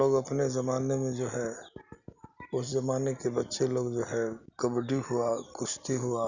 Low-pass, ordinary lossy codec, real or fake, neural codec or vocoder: 7.2 kHz; none; real; none